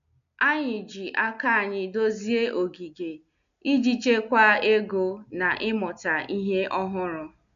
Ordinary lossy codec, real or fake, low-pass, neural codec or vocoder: none; real; 7.2 kHz; none